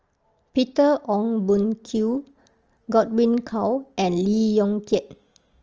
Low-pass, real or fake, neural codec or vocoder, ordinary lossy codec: 7.2 kHz; real; none; Opus, 24 kbps